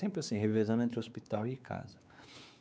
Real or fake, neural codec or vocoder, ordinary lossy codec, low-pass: fake; codec, 16 kHz, 4 kbps, X-Codec, WavLM features, trained on Multilingual LibriSpeech; none; none